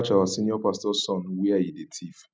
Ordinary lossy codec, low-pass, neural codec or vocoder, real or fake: none; none; none; real